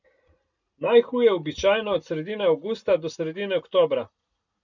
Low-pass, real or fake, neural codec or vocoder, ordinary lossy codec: 7.2 kHz; real; none; none